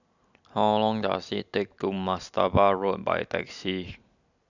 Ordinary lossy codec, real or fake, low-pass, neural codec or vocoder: none; real; 7.2 kHz; none